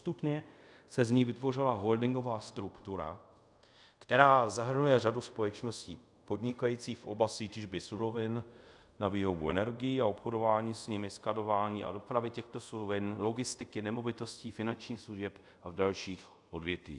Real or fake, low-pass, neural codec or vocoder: fake; 10.8 kHz; codec, 24 kHz, 0.5 kbps, DualCodec